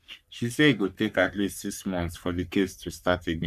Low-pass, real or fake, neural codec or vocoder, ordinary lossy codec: 14.4 kHz; fake; codec, 44.1 kHz, 3.4 kbps, Pupu-Codec; none